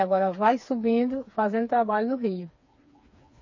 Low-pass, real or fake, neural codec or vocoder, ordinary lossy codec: 7.2 kHz; fake; codec, 16 kHz, 4 kbps, FreqCodec, smaller model; MP3, 32 kbps